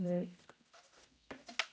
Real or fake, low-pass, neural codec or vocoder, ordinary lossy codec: fake; none; codec, 16 kHz, 0.5 kbps, X-Codec, HuBERT features, trained on general audio; none